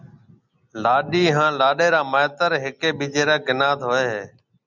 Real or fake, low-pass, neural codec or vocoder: real; 7.2 kHz; none